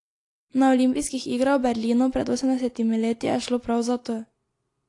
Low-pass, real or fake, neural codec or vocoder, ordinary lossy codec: 10.8 kHz; real; none; AAC, 48 kbps